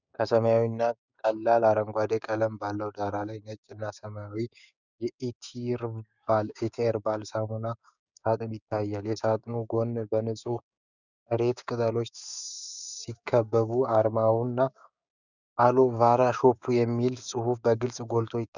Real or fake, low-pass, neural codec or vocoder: fake; 7.2 kHz; codec, 16 kHz, 6 kbps, DAC